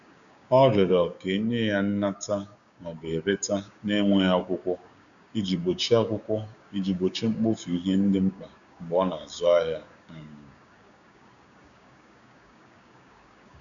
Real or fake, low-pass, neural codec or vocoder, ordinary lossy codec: fake; 7.2 kHz; codec, 16 kHz, 6 kbps, DAC; none